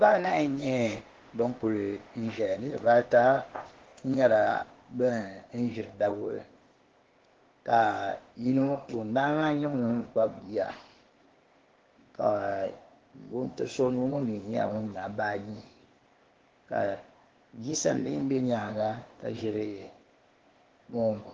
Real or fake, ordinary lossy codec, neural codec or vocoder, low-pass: fake; Opus, 16 kbps; codec, 16 kHz, 0.8 kbps, ZipCodec; 7.2 kHz